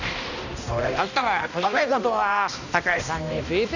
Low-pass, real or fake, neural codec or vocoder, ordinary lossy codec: 7.2 kHz; fake; codec, 16 kHz, 1 kbps, X-Codec, HuBERT features, trained on balanced general audio; none